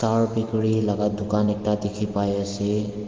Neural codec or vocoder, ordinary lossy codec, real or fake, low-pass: none; Opus, 16 kbps; real; 7.2 kHz